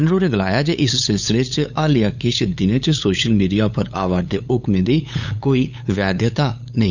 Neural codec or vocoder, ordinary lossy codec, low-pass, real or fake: codec, 16 kHz, 8 kbps, FunCodec, trained on LibriTTS, 25 frames a second; none; 7.2 kHz; fake